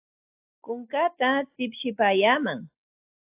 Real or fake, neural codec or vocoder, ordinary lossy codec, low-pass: real; none; AAC, 24 kbps; 3.6 kHz